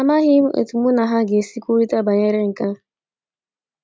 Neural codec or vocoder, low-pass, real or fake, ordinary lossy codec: none; none; real; none